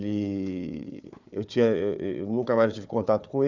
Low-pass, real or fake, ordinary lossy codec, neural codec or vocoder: 7.2 kHz; fake; none; codec, 16 kHz, 4 kbps, FunCodec, trained on Chinese and English, 50 frames a second